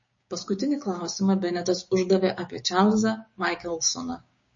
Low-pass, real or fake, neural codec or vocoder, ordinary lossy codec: 7.2 kHz; fake; codec, 16 kHz, 6 kbps, DAC; MP3, 32 kbps